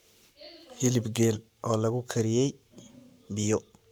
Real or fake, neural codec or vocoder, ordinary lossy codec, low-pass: fake; codec, 44.1 kHz, 7.8 kbps, Pupu-Codec; none; none